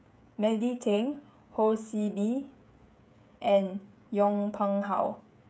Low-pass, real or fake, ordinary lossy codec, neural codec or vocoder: none; fake; none; codec, 16 kHz, 16 kbps, FreqCodec, smaller model